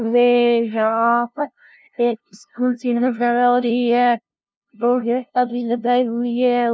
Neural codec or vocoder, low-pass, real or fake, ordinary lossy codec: codec, 16 kHz, 0.5 kbps, FunCodec, trained on LibriTTS, 25 frames a second; none; fake; none